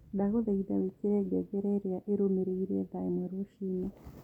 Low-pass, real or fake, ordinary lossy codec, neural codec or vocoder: 19.8 kHz; real; none; none